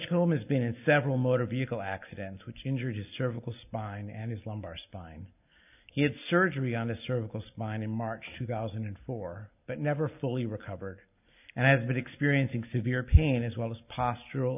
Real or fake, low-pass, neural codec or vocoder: real; 3.6 kHz; none